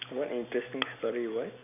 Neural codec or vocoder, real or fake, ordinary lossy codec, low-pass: none; real; none; 3.6 kHz